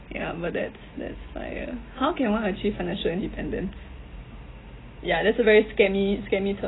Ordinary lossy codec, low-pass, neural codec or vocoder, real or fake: AAC, 16 kbps; 7.2 kHz; none; real